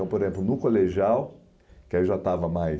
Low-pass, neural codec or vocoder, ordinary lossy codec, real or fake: none; none; none; real